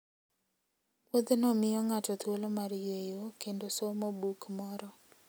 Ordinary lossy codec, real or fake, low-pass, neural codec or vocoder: none; real; none; none